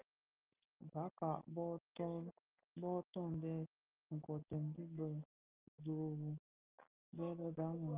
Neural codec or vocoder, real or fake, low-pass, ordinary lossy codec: none; real; 3.6 kHz; Opus, 24 kbps